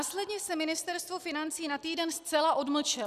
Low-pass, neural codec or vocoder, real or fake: 14.4 kHz; none; real